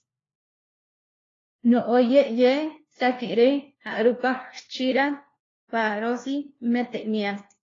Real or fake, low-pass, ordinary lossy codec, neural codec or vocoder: fake; 7.2 kHz; AAC, 32 kbps; codec, 16 kHz, 1 kbps, FunCodec, trained on LibriTTS, 50 frames a second